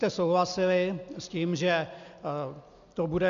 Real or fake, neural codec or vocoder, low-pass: real; none; 7.2 kHz